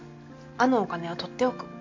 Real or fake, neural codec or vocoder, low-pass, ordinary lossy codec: real; none; 7.2 kHz; MP3, 48 kbps